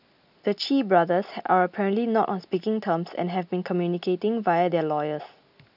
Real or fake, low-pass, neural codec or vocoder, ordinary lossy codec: real; 5.4 kHz; none; none